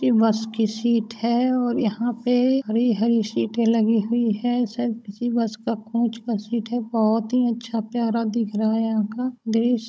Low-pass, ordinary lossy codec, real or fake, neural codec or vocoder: none; none; fake; codec, 16 kHz, 16 kbps, FunCodec, trained on Chinese and English, 50 frames a second